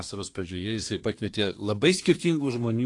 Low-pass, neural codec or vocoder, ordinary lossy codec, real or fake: 10.8 kHz; codec, 24 kHz, 1 kbps, SNAC; AAC, 48 kbps; fake